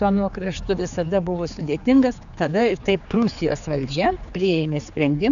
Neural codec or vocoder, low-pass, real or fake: codec, 16 kHz, 4 kbps, X-Codec, HuBERT features, trained on general audio; 7.2 kHz; fake